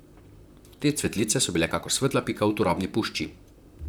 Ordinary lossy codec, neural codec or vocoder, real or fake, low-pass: none; vocoder, 44.1 kHz, 128 mel bands, Pupu-Vocoder; fake; none